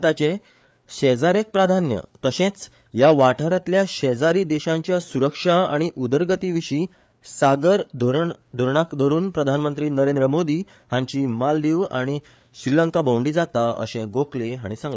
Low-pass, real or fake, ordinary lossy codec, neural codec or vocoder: none; fake; none; codec, 16 kHz, 4 kbps, FreqCodec, larger model